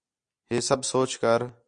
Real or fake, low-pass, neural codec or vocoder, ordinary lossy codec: real; 9.9 kHz; none; AAC, 64 kbps